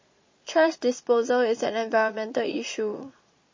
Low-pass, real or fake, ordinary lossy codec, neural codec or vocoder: 7.2 kHz; fake; MP3, 32 kbps; vocoder, 22.05 kHz, 80 mel bands, Vocos